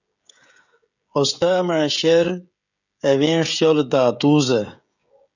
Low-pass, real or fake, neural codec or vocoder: 7.2 kHz; fake; codec, 16 kHz, 16 kbps, FreqCodec, smaller model